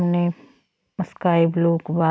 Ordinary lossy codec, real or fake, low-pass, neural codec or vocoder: none; real; none; none